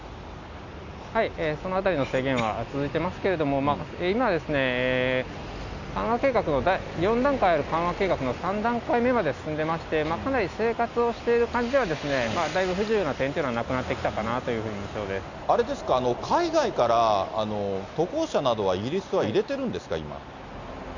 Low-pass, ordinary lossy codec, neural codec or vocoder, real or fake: 7.2 kHz; none; none; real